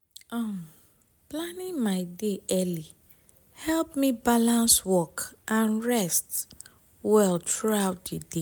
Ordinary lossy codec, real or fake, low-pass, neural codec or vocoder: none; real; none; none